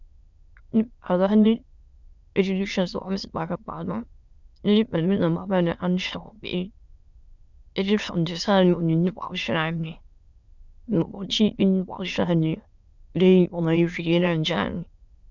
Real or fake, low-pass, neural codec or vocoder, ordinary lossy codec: fake; 7.2 kHz; autoencoder, 22.05 kHz, a latent of 192 numbers a frame, VITS, trained on many speakers; Opus, 64 kbps